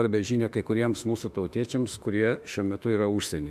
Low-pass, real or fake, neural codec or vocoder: 14.4 kHz; fake; autoencoder, 48 kHz, 32 numbers a frame, DAC-VAE, trained on Japanese speech